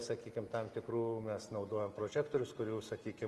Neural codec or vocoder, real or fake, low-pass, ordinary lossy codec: none; real; 14.4 kHz; Opus, 24 kbps